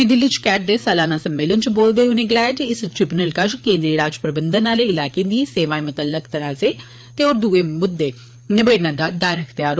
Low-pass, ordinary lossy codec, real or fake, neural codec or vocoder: none; none; fake; codec, 16 kHz, 4 kbps, FreqCodec, larger model